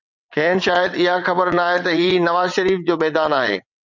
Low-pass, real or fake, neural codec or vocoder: 7.2 kHz; fake; vocoder, 22.05 kHz, 80 mel bands, WaveNeXt